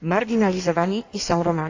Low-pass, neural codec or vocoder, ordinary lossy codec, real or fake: 7.2 kHz; codec, 16 kHz in and 24 kHz out, 1.1 kbps, FireRedTTS-2 codec; AAC, 48 kbps; fake